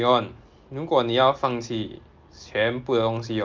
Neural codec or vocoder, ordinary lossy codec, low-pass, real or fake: none; Opus, 32 kbps; 7.2 kHz; real